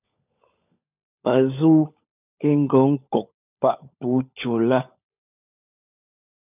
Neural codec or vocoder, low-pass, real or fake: codec, 16 kHz, 16 kbps, FunCodec, trained on LibriTTS, 50 frames a second; 3.6 kHz; fake